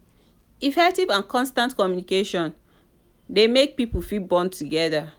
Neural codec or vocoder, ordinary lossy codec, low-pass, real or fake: none; none; none; real